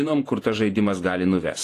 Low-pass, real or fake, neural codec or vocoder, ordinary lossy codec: 14.4 kHz; fake; vocoder, 48 kHz, 128 mel bands, Vocos; AAC, 48 kbps